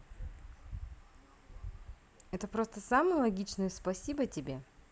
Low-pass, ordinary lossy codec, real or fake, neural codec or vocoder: none; none; real; none